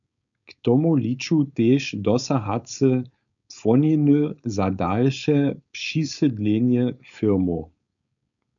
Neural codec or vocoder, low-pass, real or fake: codec, 16 kHz, 4.8 kbps, FACodec; 7.2 kHz; fake